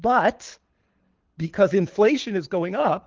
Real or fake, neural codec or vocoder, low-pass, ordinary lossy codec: fake; codec, 24 kHz, 6 kbps, HILCodec; 7.2 kHz; Opus, 16 kbps